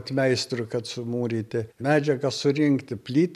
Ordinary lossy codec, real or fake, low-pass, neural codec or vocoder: MP3, 96 kbps; real; 14.4 kHz; none